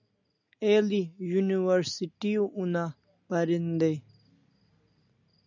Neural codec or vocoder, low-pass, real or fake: none; 7.2 kHz; real